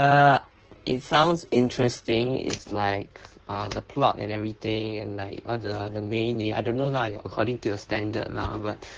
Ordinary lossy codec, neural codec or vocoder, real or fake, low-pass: Opus, 16 kbps; codec, 16 kHz in and 24 kHz out, 1.1 kbps, FireRedTTS-2 codec; fake; 9.9 kHz